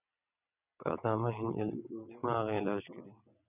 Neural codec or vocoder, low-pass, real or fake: vocoder, 22.05 kHz, 80 mel bands, WaveNeXt; 3.6 kHz; fake